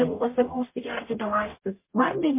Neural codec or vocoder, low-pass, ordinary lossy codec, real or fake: codec, 44.1 kHz, 0.9 kbps, DAC; 3.6 kHz; MP3, 24 kbps; fake